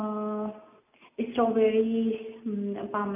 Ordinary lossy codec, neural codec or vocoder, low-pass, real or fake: none; none; 3.6 kHz; real